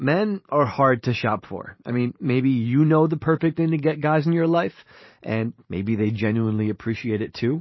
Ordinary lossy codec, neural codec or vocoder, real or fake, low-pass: MP3, 24 kbps; vocoder, 44.1 kHz, 128 mel bands every 512 samples, BigVGAN v2; fake; 7.2 kHz